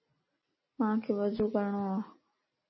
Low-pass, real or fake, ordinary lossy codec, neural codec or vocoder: 7.2 kHz; real; MP3, 24 kbps; none